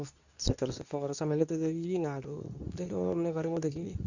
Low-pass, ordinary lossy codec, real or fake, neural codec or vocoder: 7.2 kHz; none; fake; codec, 24 kHz, 0.9 kbps, WavTokenizer, medium speech release version 2